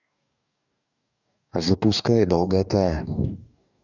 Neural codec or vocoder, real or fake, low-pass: codec, 44.1 kHz, 2.6 kbps, DAC; fake; 7.2 kHz